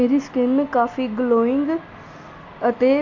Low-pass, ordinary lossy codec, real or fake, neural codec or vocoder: 7.2 kHz; AAC, 48 kbps; real; none